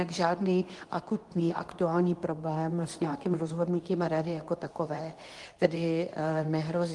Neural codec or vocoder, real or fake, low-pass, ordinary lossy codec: codec, 24 kHz, 0.9 kbps, WavTokenizer, medium speech release version 2; fake; 10.8 kHz; Opus, 24 kbps